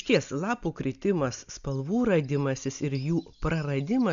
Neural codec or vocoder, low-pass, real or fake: none; 7.2 kHz; real